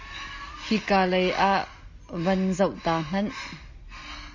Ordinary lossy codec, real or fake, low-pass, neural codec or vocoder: Opus, 64 kbps; real; 7.2 kHz; none